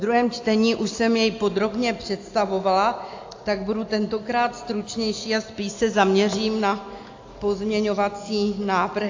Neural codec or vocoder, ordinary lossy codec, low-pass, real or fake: none; AAC, 48 kbps; 7.2 kHz; real